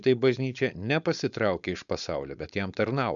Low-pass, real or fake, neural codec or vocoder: 7.2 kHz; fake; codec, 16 kHz, 4.8 kbps, FACodec